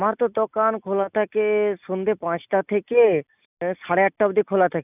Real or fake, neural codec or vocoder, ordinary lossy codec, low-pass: real; none; none; 3.6 kHz